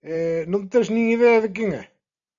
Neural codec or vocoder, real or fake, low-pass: none; real; 7.2 kHz